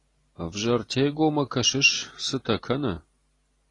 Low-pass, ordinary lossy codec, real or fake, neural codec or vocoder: 10.8 kHz; AAC, 32 kbps; real; none